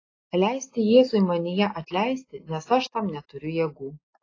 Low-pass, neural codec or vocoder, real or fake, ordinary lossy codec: 7.2 kHz; none; real; AAC, 32 kbps